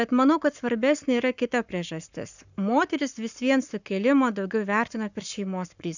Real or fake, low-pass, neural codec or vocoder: fake; 7.2 kHz; codec, 44.1 kHz, 7.8 kbps, Pupu-Codec